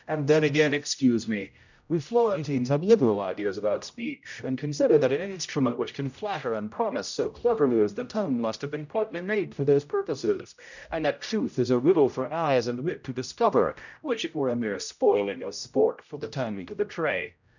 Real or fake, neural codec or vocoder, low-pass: fake; codec, 16 kHz, 0.5 kbps, X-Codec, HuBERT features, trained on general audio; 7.2 kHz